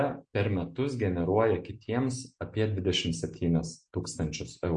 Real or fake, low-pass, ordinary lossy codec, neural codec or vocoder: real; 9.9 kHz; MP3, 48 kbps; none